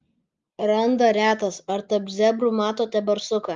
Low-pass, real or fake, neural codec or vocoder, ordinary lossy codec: 7.2 kHz; real; none; Opus, 32 kbps